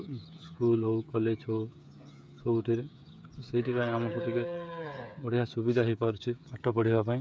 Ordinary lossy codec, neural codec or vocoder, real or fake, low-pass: none; codec, 16 kHz, 8 kbps, FreqCodec, smaller model; fake; none